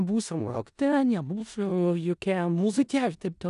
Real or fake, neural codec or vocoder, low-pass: fake; codec, 16 kHz in and 24 kHz out, 0.4 kbps, LongCat-Audio-Codec, four codebook decoder; 10.8 kHz